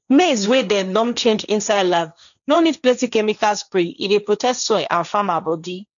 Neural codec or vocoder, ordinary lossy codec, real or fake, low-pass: codec, 16 kHz, 1.1 kbps, Voila-Tokenizer; none; fake; 7.2 kHz